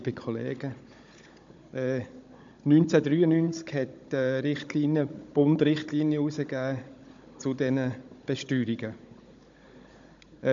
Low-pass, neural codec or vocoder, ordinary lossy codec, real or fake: 7.2 kHz; codec, 16 kHz, 16 kbps, FunCodec, trained on Chinese and English, 50 frames a second; MP3, 64 kbps; fake